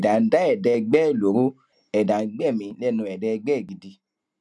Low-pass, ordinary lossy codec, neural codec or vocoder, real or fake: none; none; none; real